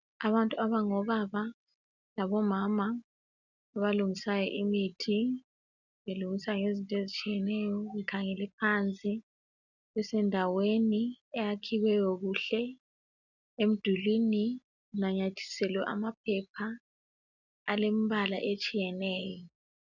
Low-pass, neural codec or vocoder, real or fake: 7.2 kHz; none; real